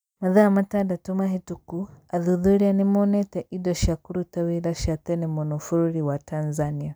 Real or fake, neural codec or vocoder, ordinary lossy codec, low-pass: real; none; none; none